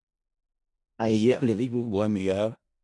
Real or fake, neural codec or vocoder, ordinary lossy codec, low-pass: fake; codec, 16 kHz in and 24 kHz out, 0.4 kbps, LongCat-Audio-Codec, four codebook decoder; MP3, 64 kbps; 10.8 kHz